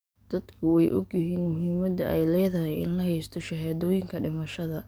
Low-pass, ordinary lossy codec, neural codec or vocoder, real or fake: none; none; codec, 44.1 kHz, 7.8 kbps, DAC; fake